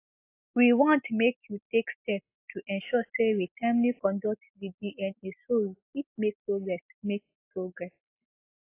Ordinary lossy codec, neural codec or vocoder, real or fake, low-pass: AAC, 24 kbps; none; real; 3.6 kHz